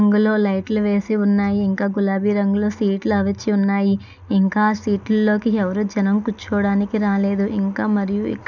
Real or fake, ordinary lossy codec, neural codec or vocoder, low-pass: real; none; none; 7.2 kHz